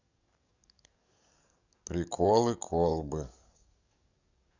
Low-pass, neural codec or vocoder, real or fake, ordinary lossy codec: 7.2 kHz; codec, 44.1 kHz, 7.8 kbps, DAC; fake; none